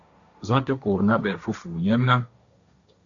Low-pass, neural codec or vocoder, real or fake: 7.2 kHz; codec, 16 kHz, 1.1 kbps, Voila-Tokenizer; fake